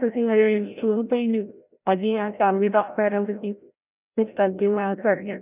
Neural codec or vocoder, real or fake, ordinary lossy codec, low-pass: codec, 16 kHz, 0.5 kbps, FreqCodec, larger model; fake; none; 3.6 kHz